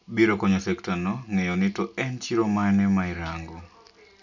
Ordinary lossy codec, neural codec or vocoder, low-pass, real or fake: none; none; 7.2 kHz; real